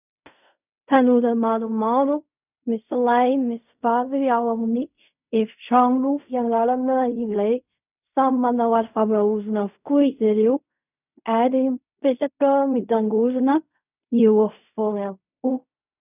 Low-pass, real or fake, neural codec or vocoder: 3.6 kHz; fake; codec, 16 kHz in and 24 kHz out, 0.4 kbps, LongCat-Audio-Codec, fine tuned four codebook decoder